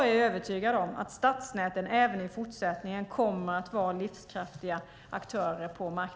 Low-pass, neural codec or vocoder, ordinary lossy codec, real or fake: none; none; none; real